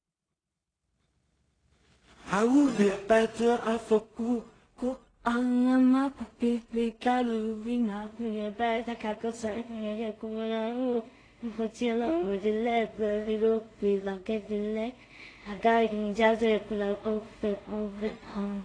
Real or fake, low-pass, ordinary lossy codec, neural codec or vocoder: fake; 9.9 kHz; AAC, 32 kbps; codec, 16 kHz in and 24 kHz out, 0.4 kbps, LongCat-Audio-Codec, two codebook decoder